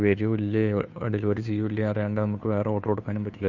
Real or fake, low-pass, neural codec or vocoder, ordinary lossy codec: fake; 7.2 kHz; codec, 16 kHz, 2 kbps, FunCodec, trained on Chinese and English, 25 frames a second; none